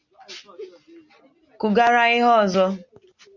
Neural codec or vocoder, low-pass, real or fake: none; 7.2 kHz; real